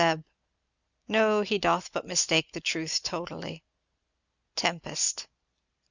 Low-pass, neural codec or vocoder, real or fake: 7.2 kHz; none; real